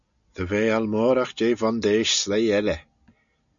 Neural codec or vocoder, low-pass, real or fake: none; 7.2 kHz; real